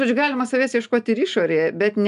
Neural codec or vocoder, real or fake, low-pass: none; real; 10.8 kHz